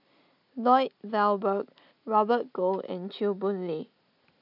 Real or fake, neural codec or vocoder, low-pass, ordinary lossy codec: real; none; 5.4 kHz; none